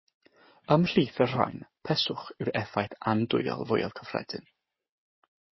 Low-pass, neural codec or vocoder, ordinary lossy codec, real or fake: 7.2 kHz; none; MP3, 24 kbps; real